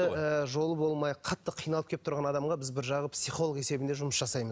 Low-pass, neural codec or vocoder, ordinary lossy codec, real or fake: none; none; none; real